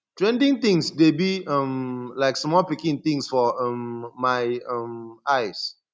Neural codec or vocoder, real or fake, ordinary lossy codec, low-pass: none; real; none; none